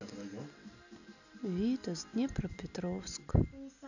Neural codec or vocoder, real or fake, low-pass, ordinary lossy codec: none; real; 7.2 kHz; none